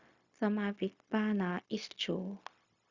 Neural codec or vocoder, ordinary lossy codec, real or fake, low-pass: codec, 16 kHz, 0.4 kbps, LongCat-Audio-Codec; Opus, 64 kbps; fake; 7.2 kHz